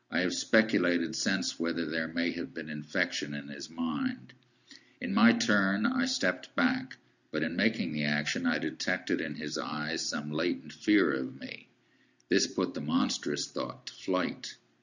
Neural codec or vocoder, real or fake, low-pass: none; real; 7.2 kHz